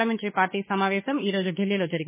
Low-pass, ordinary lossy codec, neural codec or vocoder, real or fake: 3.6 kHz; MP3, 16 kbps; autoencoder, 48 kHz, 32 numbers a frame, DAC-VAE, trained on Japanese speech; fake